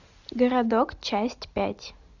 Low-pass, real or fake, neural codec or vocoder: 7.2 kHz; real; none